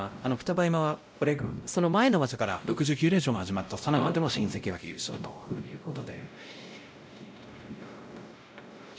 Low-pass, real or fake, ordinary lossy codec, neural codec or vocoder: none; fake; none; codec, 16 kHz, 0.5 kbps, X-Codec, WavLM features, trained on Multilingual LibriSpeech